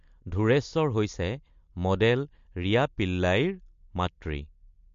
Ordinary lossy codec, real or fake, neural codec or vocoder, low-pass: MP3, 48 kbps; real; none; 7.2 kHz